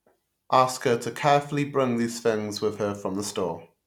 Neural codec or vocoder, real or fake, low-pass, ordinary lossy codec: none; real; 19.8 kHz; none